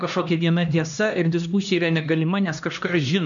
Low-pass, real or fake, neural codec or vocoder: 7.2 kHz; fake; codec, 16 kHz, 1 kbps, X-Codec, HuBERT features, trained on LibriSpeech